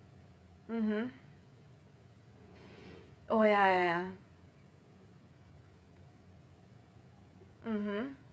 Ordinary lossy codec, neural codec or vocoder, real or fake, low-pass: none; codec, 16 kHz, 16 kbps, FreqCodec, smaller model; fake; none